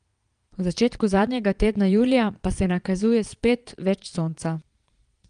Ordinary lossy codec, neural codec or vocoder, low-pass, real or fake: Opus, 32 kbps; vocoder, 22.05 kHz, 80 mel bands, Vocos; 9.9 kHz; fake